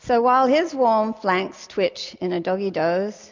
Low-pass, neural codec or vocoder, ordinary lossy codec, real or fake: 7.2 kHz; none; MP3, 64 kbps; real